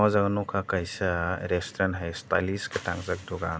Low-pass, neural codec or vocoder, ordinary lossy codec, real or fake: none; none; none; real